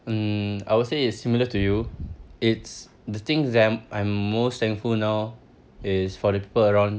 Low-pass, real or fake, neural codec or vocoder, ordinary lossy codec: none; real; none; none